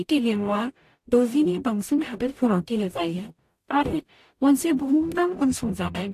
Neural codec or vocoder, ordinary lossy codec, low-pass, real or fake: codec, 44.1 kHz, 0.9 kbps, DAC; AAC, 64 kbps; 14.4 kHz; fake